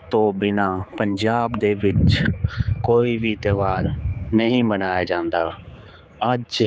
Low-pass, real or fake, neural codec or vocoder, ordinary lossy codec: none; fake; codec, 16 kHz, 4 kbps, X-Codec, HuBERT features, trained on general audio; none